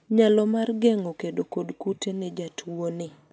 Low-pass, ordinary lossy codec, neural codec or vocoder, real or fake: none; none; none; real